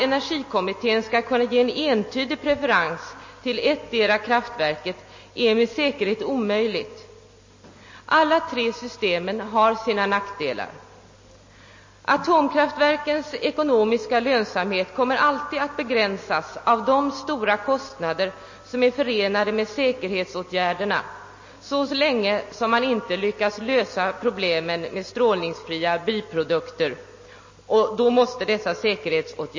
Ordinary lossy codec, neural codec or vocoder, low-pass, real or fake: MP3, 32 kbps; none; 7.2 kHz; real